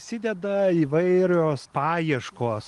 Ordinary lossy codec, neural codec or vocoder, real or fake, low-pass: Opus, 24 kbps; none; real; 10.8 kHz